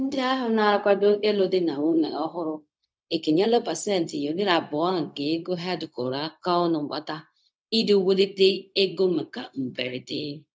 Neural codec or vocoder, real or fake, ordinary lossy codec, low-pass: codec, 16 kHz, 0.4 kbps, LongCat-Audio-Codec; fake; none; none